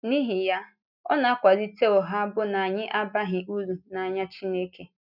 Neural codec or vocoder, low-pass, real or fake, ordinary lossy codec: none; 5.4 kHz; real; none